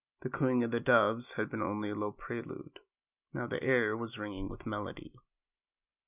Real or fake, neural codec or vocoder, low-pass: real; none; 3.6 kHz